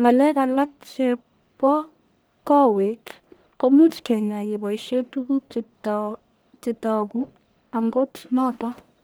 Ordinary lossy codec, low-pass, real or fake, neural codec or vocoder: none; none; fake; codec, 44.1 kHz, 1.7 kbps, Pupu-Codec